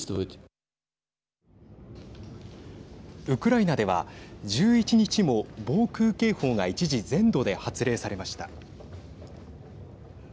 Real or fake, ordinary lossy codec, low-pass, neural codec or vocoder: real; none; none; none